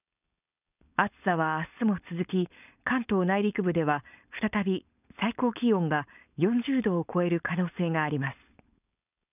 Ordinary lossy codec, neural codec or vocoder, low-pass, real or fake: none; codec, 16 kHz, 4.8 kbps, FACodec; 3.6 kHz; fake